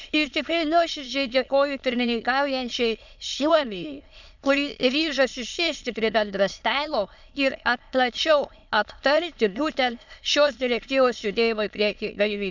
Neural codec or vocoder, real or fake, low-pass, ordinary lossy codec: autoencoder, 22.05 kHz, a latent of 192 numbers a frame, VITS, trained on many speakers; fake; 7.2 kHz; none